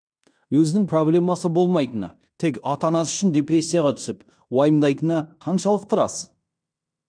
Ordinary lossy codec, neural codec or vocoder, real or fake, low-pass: none; codec, 16 kHz in and 24 kHz out, 0.9 kbps, LongCat-Audio-Codec, fine tuned four codebook decoder; fake; 9.9 kHz